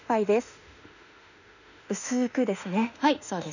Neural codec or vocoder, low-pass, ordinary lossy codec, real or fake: autoencoder, 48 kHz, 32 numbers a frame, DAC-VAE, trained on Japanese speech; 7.2 kHz; none; fake